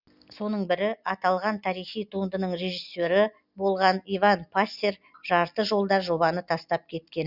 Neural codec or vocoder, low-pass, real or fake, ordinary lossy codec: none; 5.4 kHz; real; Opus, 64 kbps